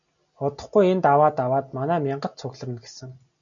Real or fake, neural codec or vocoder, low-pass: real; none; 7.2 kHz